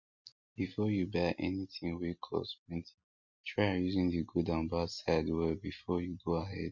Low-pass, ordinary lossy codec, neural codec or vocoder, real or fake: 7.2 kHz; MP3, 48 kbps; none; real